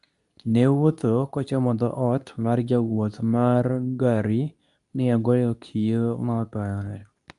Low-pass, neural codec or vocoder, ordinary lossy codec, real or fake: 10.8 kHz; codec, 24 kHz, 0.9 kbps, WavTokenizer, medium speech release version 2; none; fake